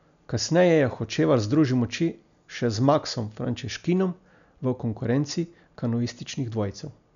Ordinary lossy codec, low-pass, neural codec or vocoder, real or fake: none; 7.2 kHz; none; real